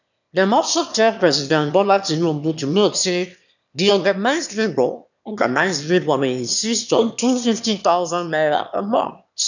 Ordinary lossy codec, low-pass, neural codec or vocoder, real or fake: none; 7.2 kHz; autoencoder, 22.05 kHz, a latent of 192 numbers a frame, VITS, trained on one speaker; fake